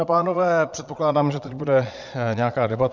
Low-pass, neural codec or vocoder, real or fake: 7.2 kHz; vocoder, 22.05 kHz, 80 mel bands, Vocos; fake